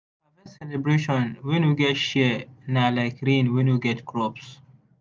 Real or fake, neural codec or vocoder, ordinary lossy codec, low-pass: real; none; none; none